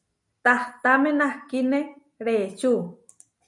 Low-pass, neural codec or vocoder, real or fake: 10.8 kHz; none; real